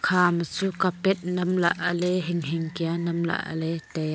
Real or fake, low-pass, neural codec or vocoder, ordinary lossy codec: real; none; none; none